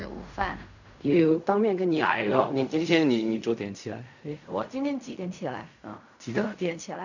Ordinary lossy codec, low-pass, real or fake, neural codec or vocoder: none; 7.2 kHz; fake; codec, 16 kHz in and 24 kHz out, 0.4 kbps, LongCat-Audio-Codec, fine tuned four codebook decoder